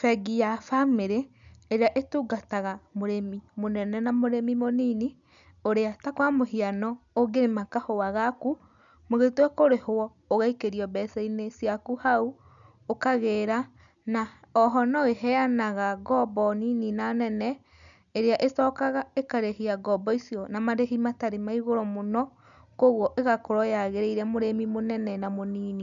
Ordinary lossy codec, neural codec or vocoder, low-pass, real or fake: none; none; 7.2 kHz; real